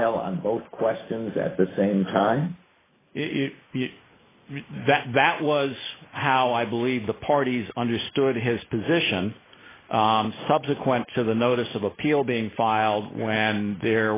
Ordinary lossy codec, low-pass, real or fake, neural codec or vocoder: AAC, 16 kbps; 3.6 kHz; real; none